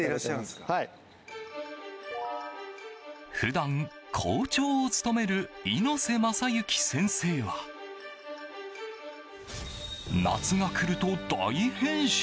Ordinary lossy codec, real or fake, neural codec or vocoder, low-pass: none; real; none; none